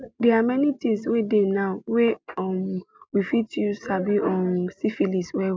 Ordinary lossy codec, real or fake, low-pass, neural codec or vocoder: none; real; none; none